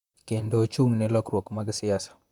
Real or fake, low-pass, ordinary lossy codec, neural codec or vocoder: fake; 19.8 kHz; none; vocoder, 44.1 kHz, 128 mel bands, Pupu-Vocoder